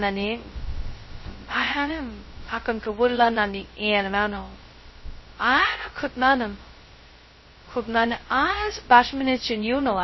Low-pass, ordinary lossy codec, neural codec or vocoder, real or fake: 7.2 kHz; MP3, 24 kbps; codec, 16 kHz, 0.2 kbps, FocalCodec; fake